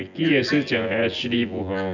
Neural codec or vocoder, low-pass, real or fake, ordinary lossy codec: vocoder, 24 kHz, 100 mel bands, Vocos; 7.2 kHz; fake; none